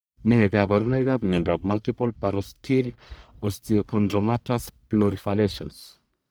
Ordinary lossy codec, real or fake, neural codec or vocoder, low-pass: none; fake; codec, 44.1 kHz, 1.7 kbps, Pupu-Codec; none